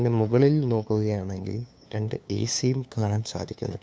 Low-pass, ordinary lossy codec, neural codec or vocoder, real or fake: none; none; codec, 16 kHz, 2 kbps, FunCodec, trained on LibriTTS, 25 frames a second; fake